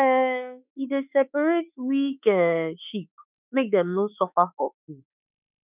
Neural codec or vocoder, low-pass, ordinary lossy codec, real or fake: autoencoder, 48 kHz, 32 numbers a frame, DAC-VAE, trained on Japanese speech; 3.6 kHz; none; fake